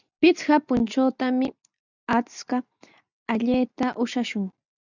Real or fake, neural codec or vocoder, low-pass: real; none; 7.2 kHz